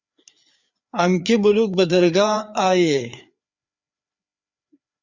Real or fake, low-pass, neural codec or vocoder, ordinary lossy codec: fake; 7.2 kHz; codec, 16 kHz, 4 kbps, FreqCodec, larger model; Opus, 64 kbps